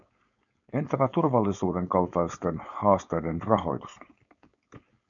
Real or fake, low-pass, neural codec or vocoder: fake; 7.2 kHz; codec, 16 kHz, 4.8 kbps, FACodec